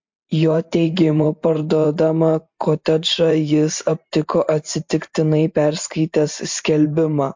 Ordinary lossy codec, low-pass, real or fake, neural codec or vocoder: MP3, 48 kbps; 7.2 kHz; fake; vocoder, 44.1 kHz, 128 mel bands every 512 samples, BigVGAN v2